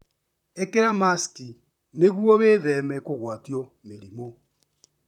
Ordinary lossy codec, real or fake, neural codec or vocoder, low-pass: none; fake; vocoder, 44.1 kHz, 128 mel bands, Pupu-Vocoder; 19.8 kHz